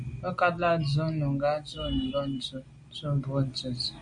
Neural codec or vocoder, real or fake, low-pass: none; real; 9.9 kHz